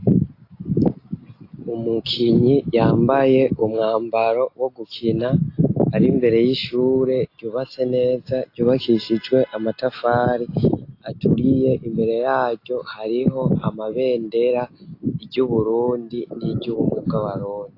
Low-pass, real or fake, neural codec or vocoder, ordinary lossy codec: 5.4 kHz; real; none; AAC, 32 kbps